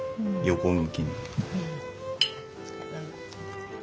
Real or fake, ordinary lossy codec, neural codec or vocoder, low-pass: real; none; none; none